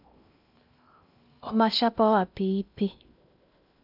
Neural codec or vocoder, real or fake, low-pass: codec, 16 kHz in and 24 kHz out, 0.6 kbps, FocalCodec, streaming, 2048 codes; fake; 5.4 kHz